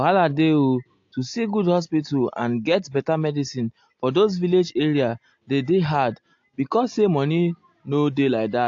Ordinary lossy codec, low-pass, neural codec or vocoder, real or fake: AAC, 48 kbps; 7.2 kHz; none; real